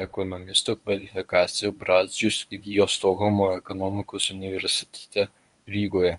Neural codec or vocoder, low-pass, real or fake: codec, 24 kHz, 0.9 kbps, WavTokenizer, medium speech release version 1; 10.8 kHz; fake